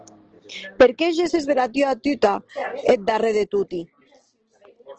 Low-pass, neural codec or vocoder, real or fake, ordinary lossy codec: 7.2 kHz; none; real; Opus, 16 kbps